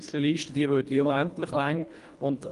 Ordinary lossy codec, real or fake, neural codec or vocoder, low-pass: Opus, 32 kbps; fake; codec, 24 kHz, 1.5 kbps, HILCodec; 10.8 kHz